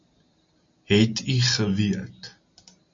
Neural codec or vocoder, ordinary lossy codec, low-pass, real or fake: none; MP3, 48 kbps; 7.2 kHz; real